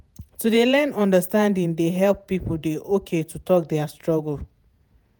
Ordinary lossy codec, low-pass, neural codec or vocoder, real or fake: none; none; vocoder, 48 kHz, 128 mel bands, Vocos; fake